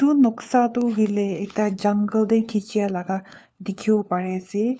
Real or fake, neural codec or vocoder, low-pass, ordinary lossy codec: fake; codec, 16 kHz, 4 kbps, FunCodec, trained on LibriTTS, 50 frames a second; none; none